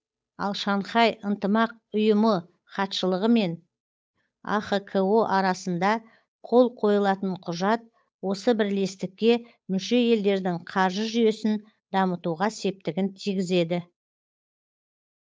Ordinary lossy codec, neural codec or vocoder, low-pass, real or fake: none; codec, 16 kHz, 8 kbps, FunCodec, trained on Chinese and English, 25 frames a second; none; fake